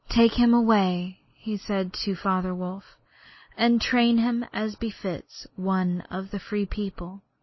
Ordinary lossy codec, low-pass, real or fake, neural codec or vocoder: MP3, 24 kbps; 7.2 kHz; real; none